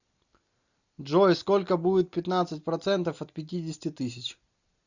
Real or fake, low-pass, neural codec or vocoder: real; 7.2 kHz; none